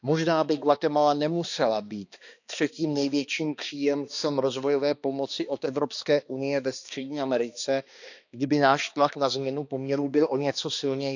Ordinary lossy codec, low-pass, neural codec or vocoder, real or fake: none; 7.2 kHz; codec, 16 kHz, 2 kbps, X-Codec, HuBERT features, trained on balanced general audio; fake